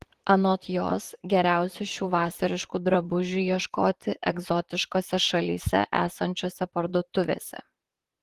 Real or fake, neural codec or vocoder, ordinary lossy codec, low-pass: fake; vocoder, 44.1 kHz, 128 mel bands, Pupu-Vocoder; Opus, 16 kbps; 14.4 kHz